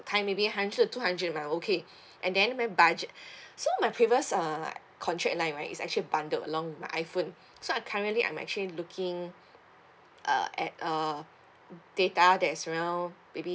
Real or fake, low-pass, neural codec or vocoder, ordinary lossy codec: real; none; none; none